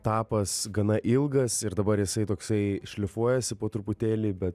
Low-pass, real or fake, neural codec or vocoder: 14.4 kHz; real; none